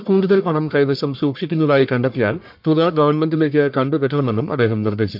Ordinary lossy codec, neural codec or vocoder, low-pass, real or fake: MP3, 48 kbps; codec, 44.1 kHz, 1.7 kbps, Pupu-Codec; 5.4 kHz; fake